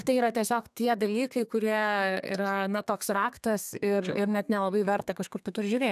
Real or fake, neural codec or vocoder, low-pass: fake; codec, 32 kHz, 1.9 kbps, SNAC; 14.4 kHz